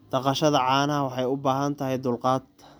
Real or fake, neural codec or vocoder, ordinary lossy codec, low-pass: real; none; none; none